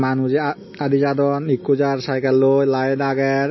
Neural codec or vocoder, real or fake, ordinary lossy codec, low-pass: none; real; MP3, 24 kbps; 7.2 kHz